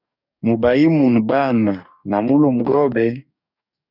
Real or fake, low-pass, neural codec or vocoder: fake; 5.4 kHz; codec, 44.1 kHz, 2.6 kbps, DAC